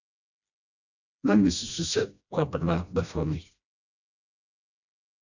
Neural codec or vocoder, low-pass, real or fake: codec, 16 kHz, 1 kbps, FreqCodec, smaller model; 7.2 kHz; fake